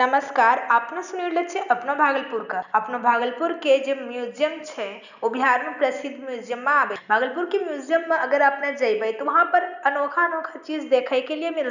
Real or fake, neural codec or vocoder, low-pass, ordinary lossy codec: real; none; 7.2 kHz; none